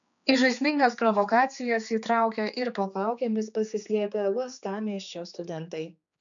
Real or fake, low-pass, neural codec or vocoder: fake; 7.2 kHz; codec, 16 kHz, 4 kbps, X-Codec, HuBERT features, trained on general audio